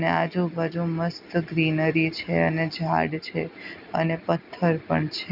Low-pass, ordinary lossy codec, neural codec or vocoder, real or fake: 5.4 kHz; none; none; real